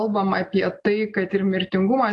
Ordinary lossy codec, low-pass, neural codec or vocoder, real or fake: AAC, 48 kbps; 10.8 kHz; none; real